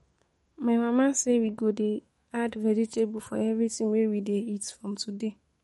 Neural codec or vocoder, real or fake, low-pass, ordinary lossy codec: autoencoder, 48 kHz, 128 numbers a frame, DAC-VAE, trained on Japanese speech; fake; 19.8 kHz; MP3, 48 kbps